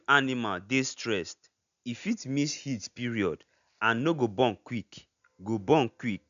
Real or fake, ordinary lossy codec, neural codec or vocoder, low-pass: real; none; none; 7.2 kHz